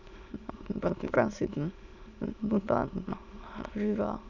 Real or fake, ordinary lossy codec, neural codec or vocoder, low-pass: fake; none; autoencoder, 22.05 kHz, a latent of 192 numbers a frame, VITS, trained on many speakers; 7.2 kHz